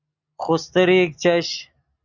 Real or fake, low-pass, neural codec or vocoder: real; 7.2 kHz; none